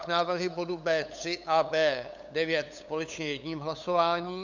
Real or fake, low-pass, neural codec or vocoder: fake; 7.2 kHz; codec, 16 kHz, 8 kbps, FunCodec, trained on LibriTTS, 25 frames a second